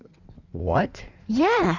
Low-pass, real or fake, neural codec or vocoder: 7.2 kHz; fake; codec, 16 kHz in and 24 kHz out, 1.1 kbps, FireRedTTS-2 codec